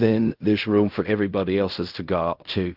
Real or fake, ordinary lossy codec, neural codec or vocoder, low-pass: fake; Opus, 32 kbps; codec, 16 kHz in and 24 kHz out, 0.4 kbps, LongCat-Audio-Codec, fine tuned four codebook decoder; 5.4 kHz